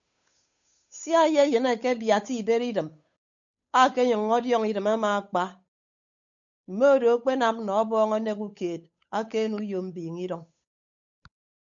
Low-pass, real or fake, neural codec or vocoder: 7.2 kHz; fake; codec, 16 kHz, 8 kbps, FunCodec, trained on Chinese and English, 25 frames a second